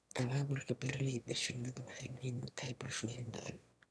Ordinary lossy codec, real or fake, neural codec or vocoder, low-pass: none; fake; autoencoder, 22.05 kHz, a latent of 192 numbers a frame, VITS, trained on one speaker; none